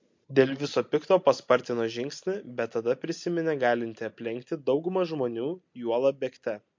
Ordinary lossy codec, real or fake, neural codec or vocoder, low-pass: MP3, 48 kbps; real; none; 7.2 kHz